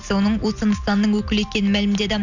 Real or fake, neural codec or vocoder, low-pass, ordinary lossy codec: real; none; 7.2 kHz; none